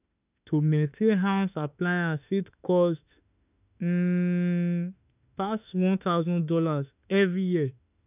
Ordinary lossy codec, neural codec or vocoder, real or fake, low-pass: none; autoencoder, 48 kHz, 32 numbers a frame, DAC-VAE, trained on Japanese speech; fake; 3.6 kHz